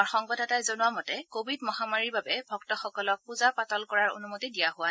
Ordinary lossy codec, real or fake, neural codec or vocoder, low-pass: none; real; none; none